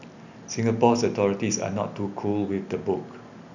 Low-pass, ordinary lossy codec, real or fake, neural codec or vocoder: 7.2 kHz; none; real; none